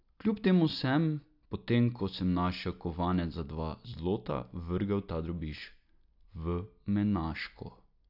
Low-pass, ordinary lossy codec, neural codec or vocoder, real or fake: 5.4 kHz; AAC, 48 kbps; none; real